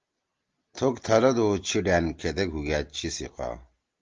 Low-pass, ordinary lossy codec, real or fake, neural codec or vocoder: 7.2 kHz; Opus, 32 kbps; real; none